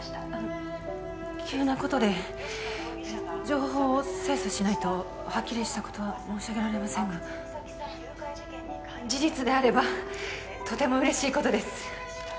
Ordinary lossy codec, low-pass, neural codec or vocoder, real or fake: none; none; none; real